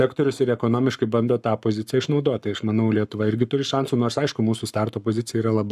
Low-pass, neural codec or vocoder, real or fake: 14.4 kHz; codec, 44.1 kHz, 7.8 kbps, Pupu-Codec; fake